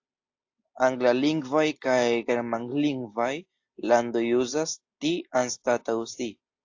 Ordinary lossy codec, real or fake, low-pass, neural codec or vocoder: AAC, 48 kbps; real; 7.2 kHz; none